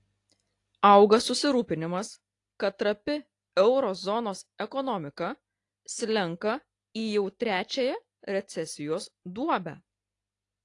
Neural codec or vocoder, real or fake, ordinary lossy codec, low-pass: none; real; AAC, 48 kbps; 10.8 kHz